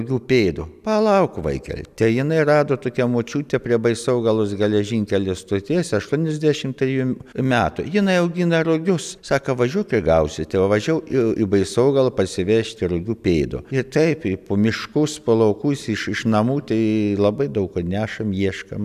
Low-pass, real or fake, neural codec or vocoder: 14.4 kHz; real; none